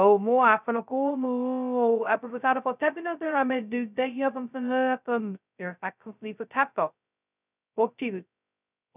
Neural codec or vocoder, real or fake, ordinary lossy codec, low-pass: codec, 16 kHz, 0.2 kbps, FocalCodec; fake; none; 3.6 kHz